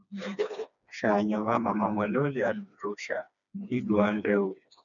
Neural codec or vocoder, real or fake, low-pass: codec, 16 kHz, 2 kbps, FreqCodec, smaller model; fake; 7.2 kHz